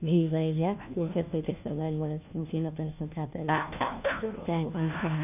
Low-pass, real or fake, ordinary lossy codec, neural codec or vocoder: 3.6 kHz; fake; none; codec, 16 kHz, 1 kbps, FunCodec, trained on LibriTTS, 50 frames a second